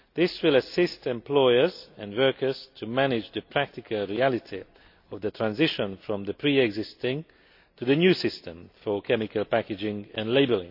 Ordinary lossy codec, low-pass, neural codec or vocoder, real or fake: AAC, 48 kbps; 5.4 kHz; none; real